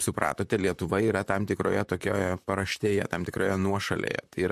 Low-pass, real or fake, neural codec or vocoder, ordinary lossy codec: 14.4 kHz; fake; vocoder, 44.1 kHz, 128 mel bands, Pupu-Vocoder; MP3, 64 kbps